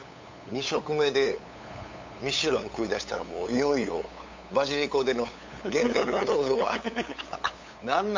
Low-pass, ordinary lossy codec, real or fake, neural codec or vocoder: 7.2 kHz; MP3, 48 kbps; fake; codec, 16 kHz, 8 kbps, FunCodec, trained on LibriTTS, 25 frames a second